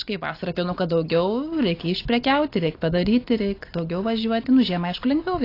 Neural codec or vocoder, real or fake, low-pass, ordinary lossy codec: none; real; 5.4 kHz; AAC, 32 kbps